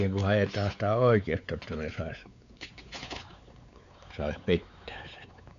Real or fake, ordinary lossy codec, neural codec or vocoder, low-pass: fake; AAC, 96 kbps; codec, 16 kHz, 4 kbps, X-Codec, WavLM features, trained on Multilingual LibriSpeech; 7.2 kHz